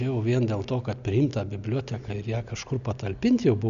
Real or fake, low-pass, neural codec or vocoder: real; 7.2 kHz; none